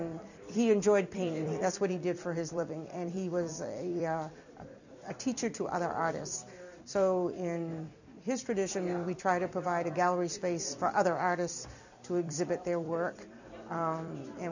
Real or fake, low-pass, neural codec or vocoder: fake; 7.2 kHz; vocoder, 44.1 kHz, 128 mel bands every 256 samples, BigVGAN v2